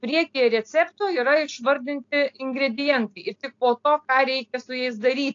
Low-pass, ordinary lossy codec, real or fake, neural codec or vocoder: 7.2 kHz; AAC, 48 kbps; real; none